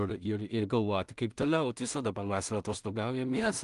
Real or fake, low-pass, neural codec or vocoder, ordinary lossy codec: fake; 10.8 kHz; codec, 16 kHz in and 24 kHz out, 0.4 kbps, LongCat-Audio-Codec, two codebook decoder; Opus, 32 kbps